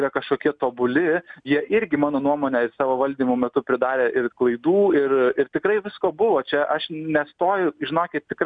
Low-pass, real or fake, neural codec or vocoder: 9.9 kHz; real; none